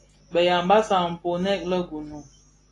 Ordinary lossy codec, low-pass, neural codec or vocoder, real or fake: AAC, 32 kbps; 10.8 kHz; none; real